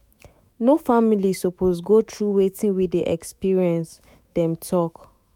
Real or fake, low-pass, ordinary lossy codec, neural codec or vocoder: fake; 19.8 kHz; MP3, 96 kbps; autoencoder, 48 kHz, 128 numbers a frame, DAC-VAE, trained on Japanese speech